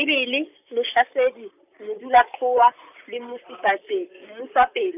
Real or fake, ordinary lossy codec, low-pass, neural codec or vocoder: fake; none; 3.6 kHz; vocoder, 44.1 kHz, 128 mel bands, Pupu-Vocoder